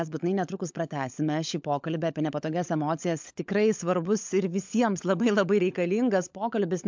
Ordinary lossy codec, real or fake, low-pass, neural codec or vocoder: MP3, 64 kbps; fake; 7.2 kHz; codec, 16 kHz, 16 kbps, FunCodec, trained on LibriTTS, 50 frames a second